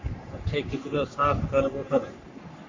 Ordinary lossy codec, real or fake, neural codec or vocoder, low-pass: MP3, 48 kbps; fake; codec, 44.1 kHz, 3.4 kbps, Pupu-Codec; 7.2 kHz